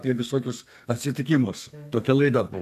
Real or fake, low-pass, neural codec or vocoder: fake; 14.4 kHz; codec, 32 kHz, 1.9 kbps, SNAC